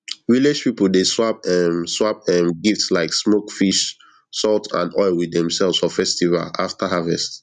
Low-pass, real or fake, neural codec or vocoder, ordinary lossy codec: 10.8 kHz; real; none; none